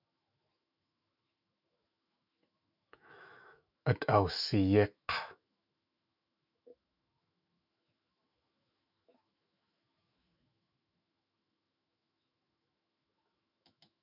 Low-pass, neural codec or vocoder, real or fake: 5.4 kHz; autoencoder, 48 kHz, 128 numbers a frame, DAC-VAE, trained on Japanese speech; fake